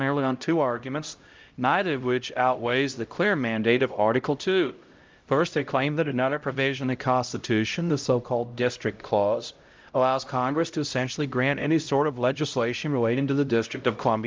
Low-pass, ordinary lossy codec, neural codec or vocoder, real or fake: 7.2 kHz; Opus, 24 kbps; codec, 16 kHz, 0.5 kbps, X-Codec, HuBERT features, trained on LibriSpeech; fake